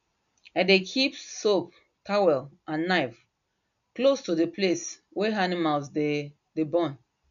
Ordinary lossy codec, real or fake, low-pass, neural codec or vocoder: AAC, 96 kbps; real; 7.2 kHz; none